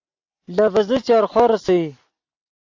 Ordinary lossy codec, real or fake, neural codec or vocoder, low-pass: AAC, 48 kbps; real; none; 7.2 kHz